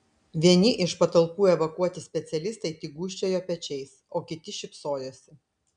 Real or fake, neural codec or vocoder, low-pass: real; none; 9.9 kHz